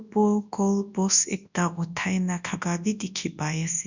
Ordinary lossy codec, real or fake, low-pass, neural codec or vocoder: none; fake; 7.2 kHz; codec, 24 kHz, 0.9 kbps, WavTokenizer, large speech release